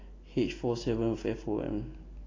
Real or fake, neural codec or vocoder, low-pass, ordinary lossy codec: real; none; 7.2 kHz; AAC, 32 kbps